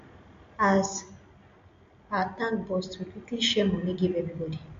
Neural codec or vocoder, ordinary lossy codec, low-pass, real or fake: none; MP3, 48 kbps; 7.2 kHz; real